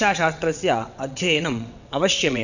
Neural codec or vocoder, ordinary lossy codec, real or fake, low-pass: vocoder, 22.05 kHz, 80 mel bands, WaveNeXt; none; fake; 7.2 kHz